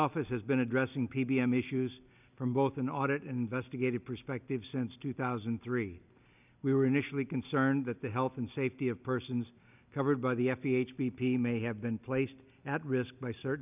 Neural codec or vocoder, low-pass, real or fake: none; 3.6 kHz; real